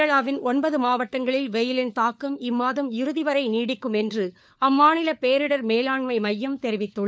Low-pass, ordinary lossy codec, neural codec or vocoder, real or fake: none; none; codec, 16 kHz, 4 kbps, FunCodec, trained on LibriTTS, 50 frames a second; fake